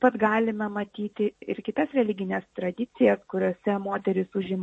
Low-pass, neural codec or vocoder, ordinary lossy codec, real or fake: 10.8 kHz; none; MP3, 32 kbps; real